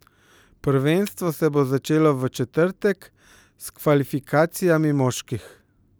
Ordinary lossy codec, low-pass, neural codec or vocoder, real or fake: none; none; none; real